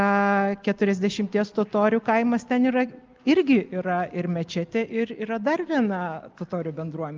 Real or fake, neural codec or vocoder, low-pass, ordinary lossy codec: real; none; 7.2 kHz; Opus, 24 kbps